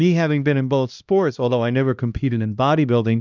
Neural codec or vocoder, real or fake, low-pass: codec, 16 kHz, 1 kbps, X-Codec, HuBERT features, trained on LibriSpeech; fake; 7.2 kHz